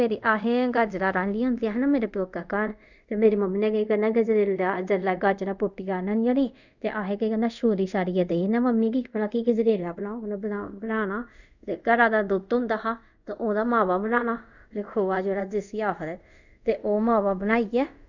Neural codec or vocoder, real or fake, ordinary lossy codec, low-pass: codec, 24 kHz, 0.5 kbps, DualCodec; fake; none; 7.2 kHz